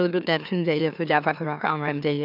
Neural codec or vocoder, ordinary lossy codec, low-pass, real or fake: autoencoder, 44.1 kHz, a latent of 192 numbers a frame, MeloTTS; none; 5.4 kHz; fake